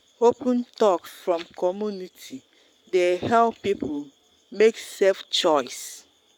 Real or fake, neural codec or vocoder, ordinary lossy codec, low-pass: fake; codec, 44.1 kHz, 7.8 kbps, Pupu-Codec; none; 19.8 kHz